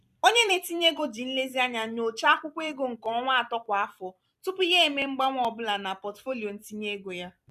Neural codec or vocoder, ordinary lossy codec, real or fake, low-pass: vocoder, 44.1 kHz, 128 mel bands every 256 samples, BigVGAN v2; none; fake; 14.4 kHz